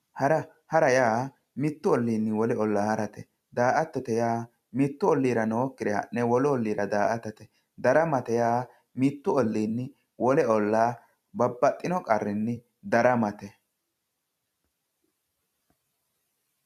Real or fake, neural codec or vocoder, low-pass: fake; vocoder, 44.1 kHz, 128 mel bands every 256 samples, BigVGAN v2; 14.4 kHz